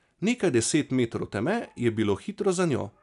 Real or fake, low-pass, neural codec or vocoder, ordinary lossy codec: real; 10.8 kHz; none; none